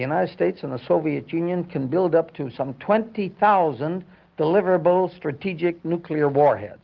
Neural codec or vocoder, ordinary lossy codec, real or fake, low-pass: none; Opus, 24 kbps; real; 7.2 kHz